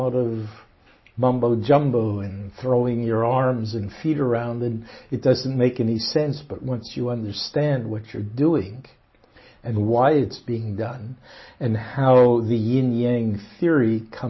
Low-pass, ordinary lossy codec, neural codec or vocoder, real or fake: 7.2 kHz; MP3, 24 kbps; none; real